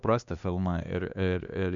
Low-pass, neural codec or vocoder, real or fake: 7.2 kHz; codec, 16 kHz, 2 kbps, X-Codec, HuBERT features, trained on LibriSpeech; fake